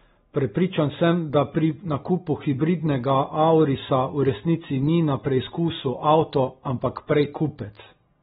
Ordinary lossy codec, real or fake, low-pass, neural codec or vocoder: AAC, 16 kbps; real; 7.2 kHz; none